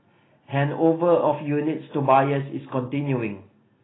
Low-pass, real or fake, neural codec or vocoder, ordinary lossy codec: 7.2 kHz; real; none; AAC, 16 kbps